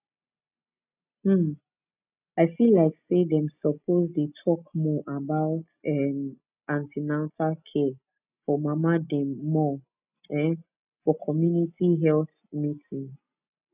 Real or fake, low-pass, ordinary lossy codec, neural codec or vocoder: real; 3.6 kHz; none; none